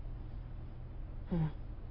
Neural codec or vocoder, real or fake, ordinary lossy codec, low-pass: none; real; none; 5.4 kHz